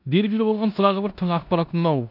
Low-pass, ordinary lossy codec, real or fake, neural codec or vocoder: 5.4 kHz; none; fake; codec, 16 kHz in and 24 kHz out, 0.9 kbps, LongCat-Audio-Codec, four codebook decoder